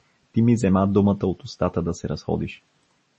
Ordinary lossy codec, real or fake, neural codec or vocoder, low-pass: MP3, 32 kbps; real; none; 10.8 kHz